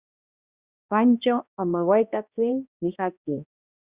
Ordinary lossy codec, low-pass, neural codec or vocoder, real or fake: Opus, 64 kbps; 3.6 kHz; codec, 16 kHz, 1 kbps, X-Codec, HuBERT features, trained on balanced general audio; fake